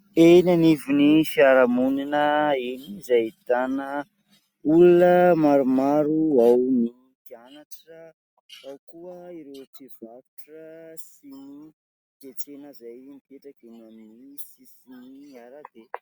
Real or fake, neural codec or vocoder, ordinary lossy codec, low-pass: real; none; Opus, 64 kbps; 19.8 kHz